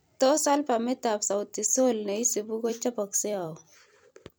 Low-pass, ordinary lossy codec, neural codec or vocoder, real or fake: none; none; vocoder, 44.1 kHz, 128 mel bands every 256 samples, BigVGAN v2; fake